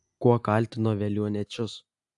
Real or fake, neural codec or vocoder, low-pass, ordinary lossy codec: real; none; 10.8 kHz; AAC, 64 kbps